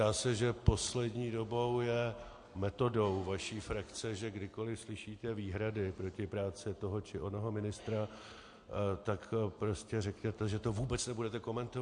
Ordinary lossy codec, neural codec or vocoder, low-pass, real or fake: MP3, 48 kbps; none; 9.9 kHz; real